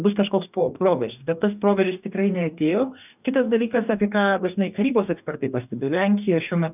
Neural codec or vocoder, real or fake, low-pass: codec, 44.1 kHz, 2.6 kbps, DAC; fake; 3.6 kHz